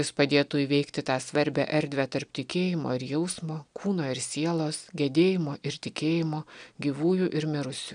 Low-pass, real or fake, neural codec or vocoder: 9.9 kHz; real; none